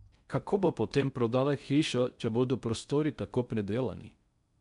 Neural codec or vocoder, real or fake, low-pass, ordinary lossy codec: codec, 16 kHz in and 24 kHz out, 0.6 kbps, FocalCodec, streaming, 4096 codes; fake; 10.8 kHz; none